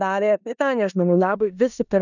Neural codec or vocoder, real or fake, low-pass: codec, 16 kHz in and 24 kHz out, 0.9 kbps, LongCat-Audio-Codec, four codebook decoder; fake; 7.2 kHz